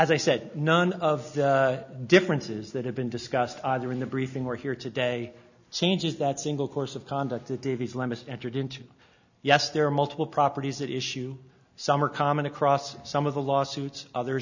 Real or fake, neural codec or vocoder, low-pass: real; none; 7.2 kHz